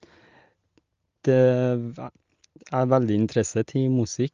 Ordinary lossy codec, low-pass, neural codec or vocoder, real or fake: Opus, 32 kbps; 7.2 kHz; none; real